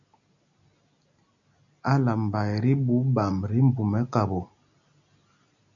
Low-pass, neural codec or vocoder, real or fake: 7.2 kHz; none; real